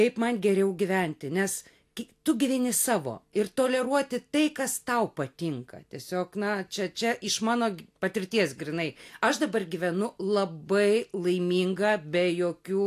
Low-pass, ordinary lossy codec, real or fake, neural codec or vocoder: 14.4 kHz; AAC, 64 kbps; real; none